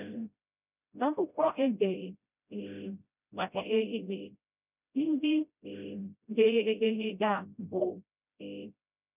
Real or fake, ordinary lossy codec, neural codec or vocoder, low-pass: fake; none; codec, 16 kHz, 0.5 kbps, FreqCodec, smaller model; 3.6 kHz